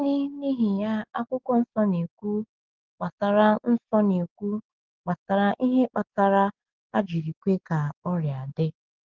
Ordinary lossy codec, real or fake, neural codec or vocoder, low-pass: Opus, 16 kbps; real; none; 7.2 kHz